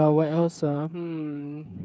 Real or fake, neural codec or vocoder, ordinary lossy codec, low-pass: fake; codec, 16 kHz, 8 kbps, FreqCodec, smaller model; none; none